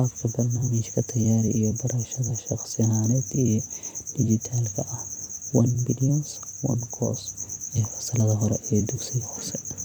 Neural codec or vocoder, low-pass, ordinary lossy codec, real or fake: vocoder, 44.1 kHz, 128 mel bands every 256 samples, BigVGAN v2; 19.8 kHz; none; fake